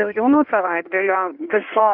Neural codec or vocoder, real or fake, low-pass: codec, 16 kHz in and 24 kHz out, 1.1 kbps, FireRedTTS-2 codec; fake; 5.4 kHz